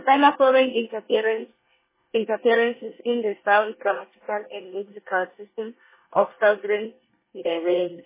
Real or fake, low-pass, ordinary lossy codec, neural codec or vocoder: fake; 3.6 kHz; MP3, 16 kbps; codec, 24 kHz, 1 kbps, SNAC